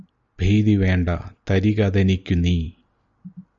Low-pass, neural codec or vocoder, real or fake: 7.2 kHz; none; real